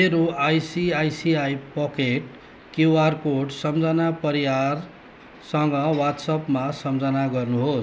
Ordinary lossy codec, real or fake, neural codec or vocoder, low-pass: none; real; none; none